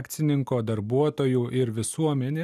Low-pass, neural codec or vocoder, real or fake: 14.4 kHz; none; real